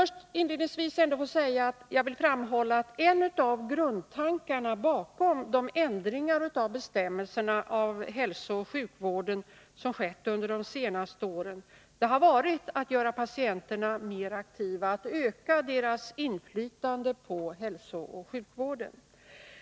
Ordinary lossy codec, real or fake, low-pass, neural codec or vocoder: none; real; none; none